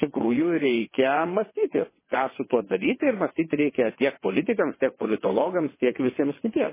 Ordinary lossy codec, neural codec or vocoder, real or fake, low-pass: MP3, 16 kbps; vocoder, 22.05 kHz, 80 mel bands, WaveNeXt; fake; 3.6 kHz